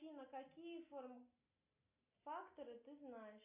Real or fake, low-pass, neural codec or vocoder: real; 3.6 kHz; none